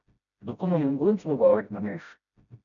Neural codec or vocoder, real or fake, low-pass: codec, 16 kHz, 0.5 kbps, FreqCodec, smaller model; fake; 7.2 kHz